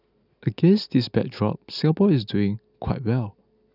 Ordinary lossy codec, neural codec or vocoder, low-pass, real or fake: none; none; 5.4 kHz; real